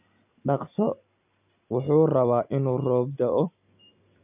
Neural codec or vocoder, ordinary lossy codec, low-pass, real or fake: none; none; 3.6 kHz; real